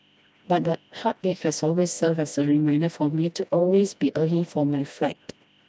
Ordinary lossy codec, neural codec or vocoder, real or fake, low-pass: none; codec, 16 kHz, 1 kbps, FreqCodec, smaller model; fake; none